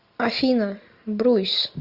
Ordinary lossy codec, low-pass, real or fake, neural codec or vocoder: Opus, 64 kbps; 5.4 kHz; real; none